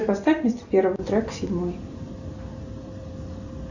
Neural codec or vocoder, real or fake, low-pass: none; real; 7.2 kHz